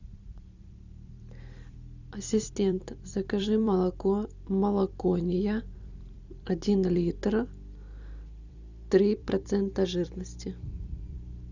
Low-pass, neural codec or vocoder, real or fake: 7.2 kHz; vocoder, 44.1 kHz, 128 mel bands every 256 samples, BigVGAN v2; fake